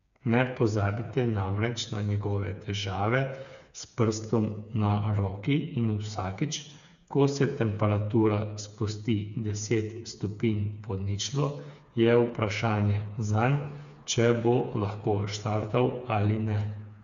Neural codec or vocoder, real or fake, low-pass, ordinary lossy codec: codec, 16 kHz, 4 kbps, FreqCodec, smaller model; fake; 7.2 kHz; none